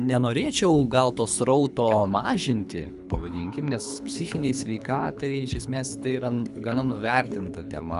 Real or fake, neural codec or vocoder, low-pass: fake; codec, 24 kHz, 3 kbps, HILCodec; 10.8 kHz